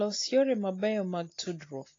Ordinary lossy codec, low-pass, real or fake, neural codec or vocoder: AAC, 32 kbps; 7.2 kHz; real; none